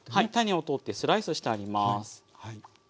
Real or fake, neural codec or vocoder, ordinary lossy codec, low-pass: real; none; none; none